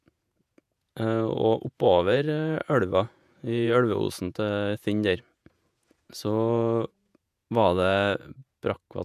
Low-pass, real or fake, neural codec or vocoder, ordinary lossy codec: 14.4 kHz; fake; vocoder, 48 kHz, 128 mel bands, Vocos; none